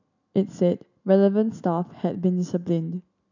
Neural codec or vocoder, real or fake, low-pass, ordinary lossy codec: none; real; 7.2 kHz; none